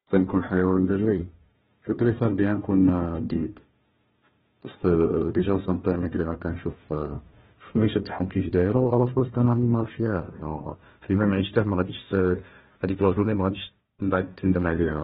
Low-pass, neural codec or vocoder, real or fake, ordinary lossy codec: 7.2 kHz; codec, 16 kHz, 1 kbps, FunCodec, trained on Chinese and English, 50 frames a second; fake; AAC, 16 kbps